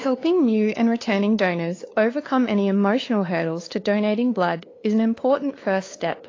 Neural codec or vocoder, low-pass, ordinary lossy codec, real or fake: codec, 16 kHz, 2 kbps, FunCodec, trained on LibriTTS, 25 frames a second; 7.2 kHz; AAC, 32 kbps; fake